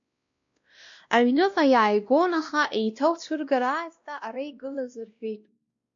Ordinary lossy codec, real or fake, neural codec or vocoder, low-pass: MP3, 48 kbps; fake; codec, 16 kHz, 1 kbps, X-Codec, WavLM features, trained on Multilingual LibriSpeech; 7.2 kHz